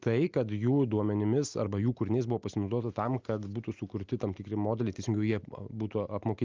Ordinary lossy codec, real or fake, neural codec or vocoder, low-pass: Opus, 24 kbps; real; none; 7.2 kHz